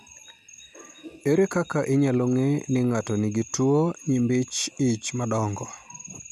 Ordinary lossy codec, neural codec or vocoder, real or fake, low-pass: none; none; real; 14.4 kHz